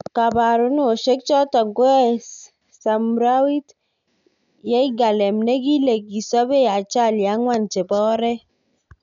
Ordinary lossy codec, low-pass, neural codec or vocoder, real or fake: none; 7.2 kHz; none; real